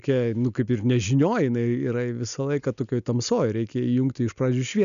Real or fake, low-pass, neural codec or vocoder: real; 7.2 kHz; none